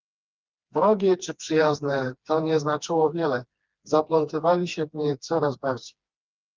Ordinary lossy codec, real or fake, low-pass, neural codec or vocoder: Opus, 32 kbps; fake; 7.2 kHz; codec, 16 kHz, 2 kbps, FreqCodec, smaller model